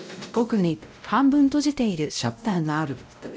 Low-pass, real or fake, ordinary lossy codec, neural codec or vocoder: none; fake; none; codec, 16 kHz, 0.5 kbps, X-Codec, WavLM features, trained on Multilingual LibriSpeech